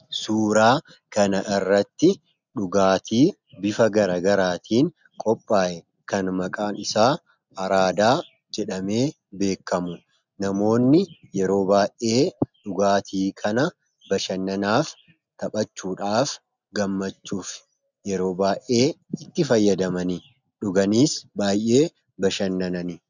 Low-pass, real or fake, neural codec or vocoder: 7.2 kHz; real; none